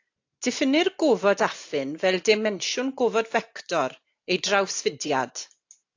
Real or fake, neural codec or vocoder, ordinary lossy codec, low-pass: real; none; AAC, 48 kbps; 7.2 kHz